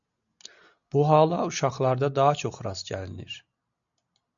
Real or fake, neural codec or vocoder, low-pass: real; none; 7.2 kHz